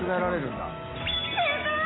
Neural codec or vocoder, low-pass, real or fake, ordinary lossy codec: none; 7.2 kHz; real; AAC, 16 kbps